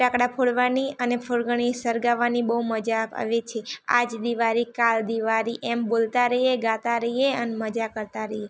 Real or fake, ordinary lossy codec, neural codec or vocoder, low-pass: real; none; none; none